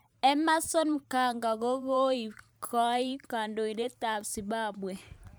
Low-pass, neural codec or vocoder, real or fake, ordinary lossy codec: none; vocoder, 44.1 kHz, 128 mel bands every 512 samples, BigVGAN v2; fake; none